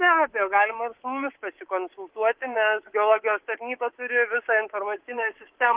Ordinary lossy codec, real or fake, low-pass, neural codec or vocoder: Opus, 16 kbps; fake; 3.6 kHz; codec, 24 kHz, 3.1 kbps, DualCodec